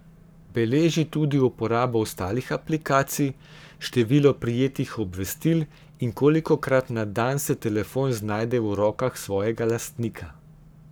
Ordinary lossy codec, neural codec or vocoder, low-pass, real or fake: none; codec, 44.1 kHz, 7.8 kbps, Pupu-Codec; none; fake